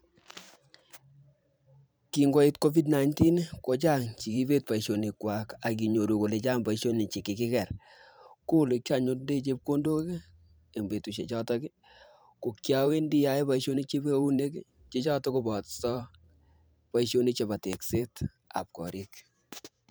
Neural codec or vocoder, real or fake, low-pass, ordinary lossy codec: vocoder, 44.1 kHz, 128 mel bands every 512 samples, BigVGAN v2; fake; none; none